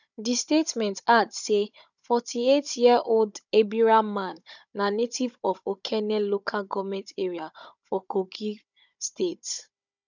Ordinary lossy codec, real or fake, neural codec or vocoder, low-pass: none; fake; codec, 16 kHz, 16 kbps, FunCodec, trained on Chinese and English, 50 frames a second; 7.2 kHz